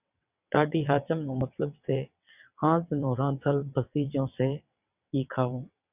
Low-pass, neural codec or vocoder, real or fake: 3.6 kHz; vocoder, 22.05 kHz, 80 mel bands, WaveNeXt; fake